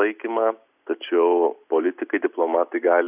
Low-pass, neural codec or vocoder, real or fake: 3.6 kHz; none; real